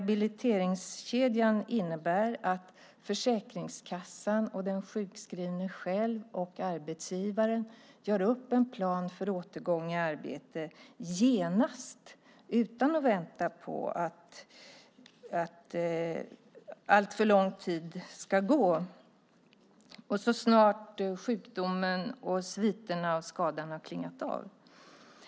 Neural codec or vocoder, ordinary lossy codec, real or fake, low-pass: none; none; real; none